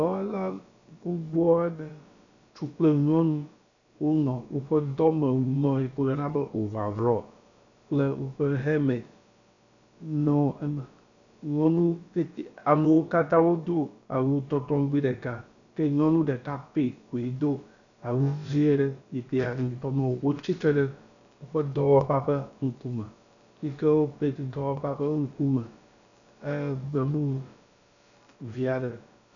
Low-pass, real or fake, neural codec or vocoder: 7.2 kHz; fake; codec, 16 kHz, about 1 kbps, DyCAST, with the encoder's durations